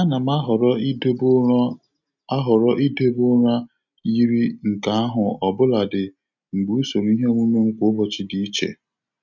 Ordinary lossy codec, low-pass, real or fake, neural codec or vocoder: none; 7.2 kHz; real; none